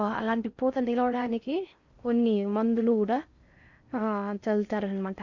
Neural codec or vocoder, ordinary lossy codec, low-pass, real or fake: codec, 16 kHz in and 24 kHz out, 0.6 kbps, FocalCodec, streaming, 4096 codes; Opus, 64 kbps; 7.2 kHz; fake